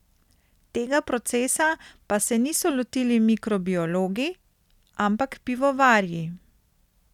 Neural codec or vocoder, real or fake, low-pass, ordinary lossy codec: none; real; 19.8 kHz; none